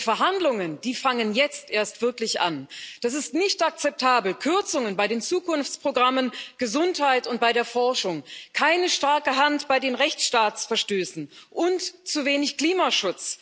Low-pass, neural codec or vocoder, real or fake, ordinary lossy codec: none; none; real; none